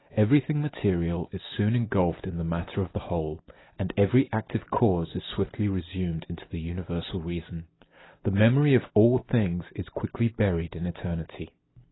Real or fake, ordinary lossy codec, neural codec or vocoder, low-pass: real; AAC, 16 kbps; none; 7.2 kHz